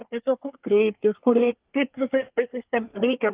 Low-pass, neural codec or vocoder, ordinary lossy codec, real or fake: 3.6 kHz; codec, 24 kHz, 1 kbps, SNAC; Opus, 32 kbps; fake